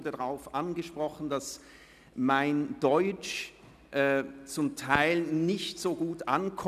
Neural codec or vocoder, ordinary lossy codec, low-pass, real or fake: none; none; 14.4 kHz; real